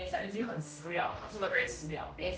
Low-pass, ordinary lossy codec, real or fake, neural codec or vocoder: none; none; fake; codec, 16 kHz, 0.5 kbps, X-Codec, HuBERT features, trained on general audio